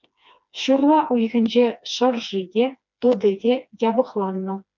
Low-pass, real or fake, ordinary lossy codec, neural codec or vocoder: 7.2 kHz; fake; MP3, 64 kbps; codec, 16 kHz, 2 kbps, FreqCodec, smaller model